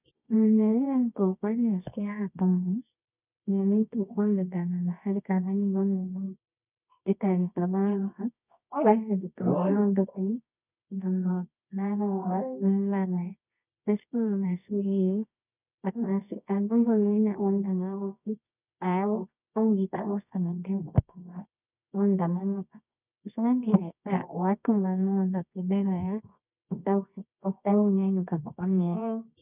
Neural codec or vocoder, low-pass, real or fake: codec, 24 kHz, 0.9 kbps, WavTokenizer, medium music audio release; 3.6 kHz; fake